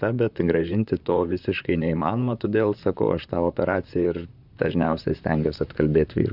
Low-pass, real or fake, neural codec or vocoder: 5.4 kHz; fake; vocoder, 44.1 kHz, 128 mel bands, Pupu-Vocoder